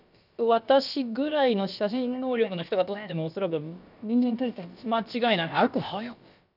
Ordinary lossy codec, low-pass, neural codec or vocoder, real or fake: none; 5.4 kHz; codec, 16 kHz, about 1 kbps, DyCAST, with the encoder's durations; fake